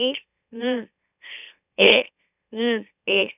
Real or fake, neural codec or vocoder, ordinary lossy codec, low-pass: fake; autoencoder, 44.1 kHz, a latent of 192 numbers a frame, MeloTTS; none; 3.6 kHz